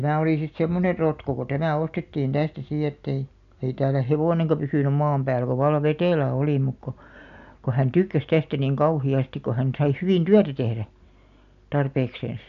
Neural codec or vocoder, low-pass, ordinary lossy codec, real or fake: none; 7.2 kHz; none; real